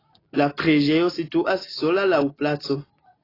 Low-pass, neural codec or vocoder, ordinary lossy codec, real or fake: 5.4 kHz; none; AAC, 24 kbps; real